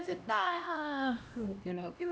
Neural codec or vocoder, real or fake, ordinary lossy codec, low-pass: codec, 16 kHz, 1 kbps, X-Codec, HuBERT features, trained on LibriSpeech; fake; none; none